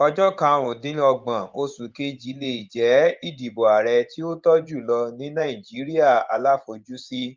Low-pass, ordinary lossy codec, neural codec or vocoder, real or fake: 7.2 kHz; Opus, 24 kbps; vocoder, 24 kHz, 100 mel bands, Vocos; fake